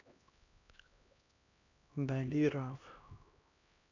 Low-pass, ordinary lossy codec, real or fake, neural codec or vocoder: 7.2 kHz; none; fake; codec, 16 kHz, 1 kbps, X-Codec, HuBERT features, trained on LibriSpeech